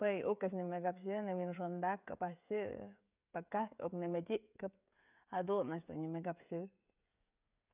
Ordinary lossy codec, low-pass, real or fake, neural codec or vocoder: none; 3.6 kHz; fake; codec, 16 kHz, 16 kbps, FreqCodec, smaller model